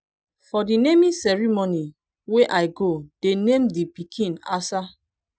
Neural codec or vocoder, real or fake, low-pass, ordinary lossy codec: none; real; none; none